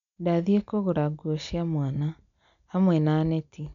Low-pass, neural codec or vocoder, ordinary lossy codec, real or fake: 7.2 kHz; none; none; real